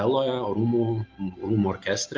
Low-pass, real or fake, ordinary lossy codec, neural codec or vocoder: 7.2 kHz; real; Opus, 24 kbps; none